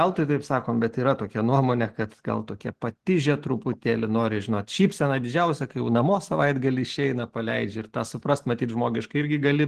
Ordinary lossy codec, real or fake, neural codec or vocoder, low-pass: Opus, 16 kbps; real; none; 14.4 kHz